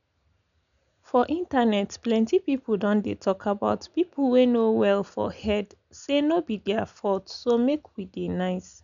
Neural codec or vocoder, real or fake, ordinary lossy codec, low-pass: none; real; none; 7.2 kHz